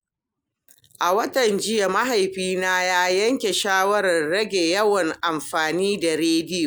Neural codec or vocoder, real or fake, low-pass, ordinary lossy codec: none; real; none; none